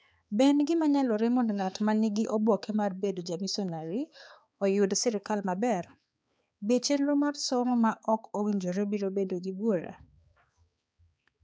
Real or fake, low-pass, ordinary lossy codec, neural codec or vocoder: fake; none; none; codec, 16 kHz, 4 kbps, X-Codec, HuBERT features, trained on balanced general audio